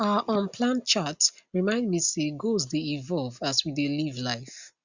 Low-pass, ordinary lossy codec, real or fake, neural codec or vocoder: 7.2 kHz; Opus, 64 kbps; real; none